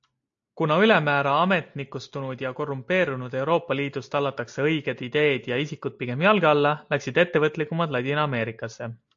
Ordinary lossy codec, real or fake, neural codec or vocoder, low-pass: AAC, 64 kbps; real; none; 7.2 kHz